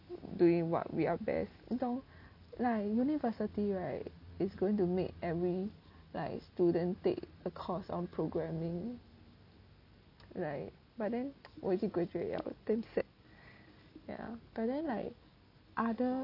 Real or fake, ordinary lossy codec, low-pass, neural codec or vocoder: real; none; 5.4 kHz; none